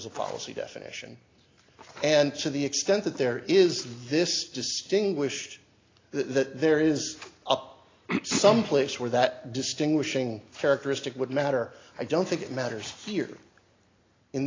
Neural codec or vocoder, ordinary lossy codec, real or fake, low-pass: none; AAC, 32 kbps; real; 7.2 kHz